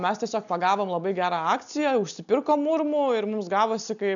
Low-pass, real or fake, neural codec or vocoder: 7.2 kHz; real; none